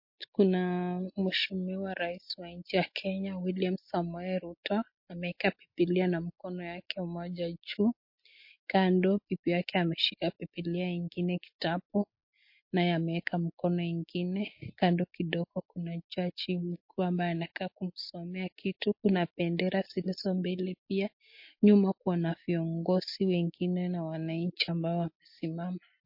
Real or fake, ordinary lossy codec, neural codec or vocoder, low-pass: real; MP3, 32 kbps; none; 5.4 kHz